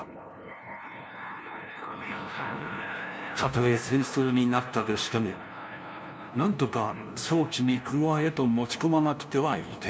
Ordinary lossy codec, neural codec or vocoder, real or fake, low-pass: none; codec, 16 kHz, 0.5 kbps, FunCodec, trained on LibriTTS, 25 frames a second; fake; none